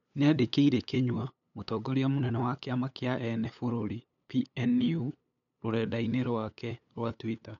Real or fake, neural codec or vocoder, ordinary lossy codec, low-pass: fake; codec, 16 kHz, 8 kbps, FunCodec, trained on LibriTTS, 25 frames a second; none; 7.2 kHz